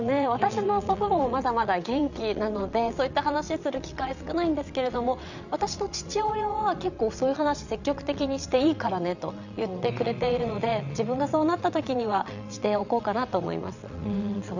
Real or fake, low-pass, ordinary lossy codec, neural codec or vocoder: fake; 7.2 kHz; none; vocoder, 22.05 kHz, 80 mel bands, WaveNeXt